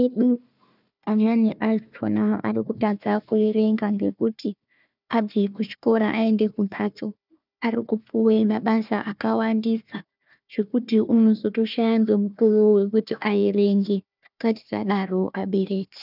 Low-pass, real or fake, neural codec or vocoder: 5.4 kHz; fake; codec, 16 kHz, 1 kbps, FunCodec, trained on Chinese and English, 50 frames a second